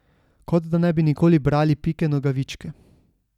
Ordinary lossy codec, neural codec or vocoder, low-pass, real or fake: none; none; 19.8 kHz; real